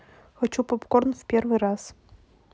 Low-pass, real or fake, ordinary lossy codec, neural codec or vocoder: none; real; none; none